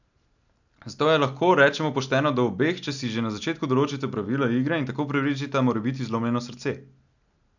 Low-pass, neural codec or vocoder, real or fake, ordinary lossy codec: 7.2 kHz; none; real; none